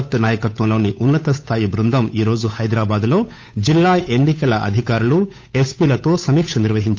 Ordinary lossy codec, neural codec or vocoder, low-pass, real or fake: none; codec, 16 kHz, 8 kbps, FunCodec, trained on Chinese and English, 25 frames a second; none; fake